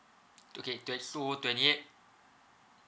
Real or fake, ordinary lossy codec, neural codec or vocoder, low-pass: real; none; none; none